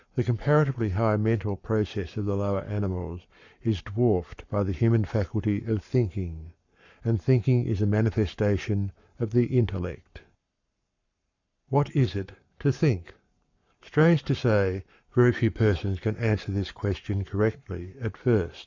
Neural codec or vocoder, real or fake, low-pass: codec, 44.1 kHz, 7.8 kbps, Pupu-Codec; fake; 7.2 kHz